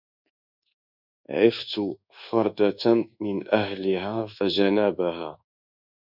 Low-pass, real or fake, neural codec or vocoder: 5.4 kHz; fake; codec, 24 kHz, 1.2 kbps, DualCodec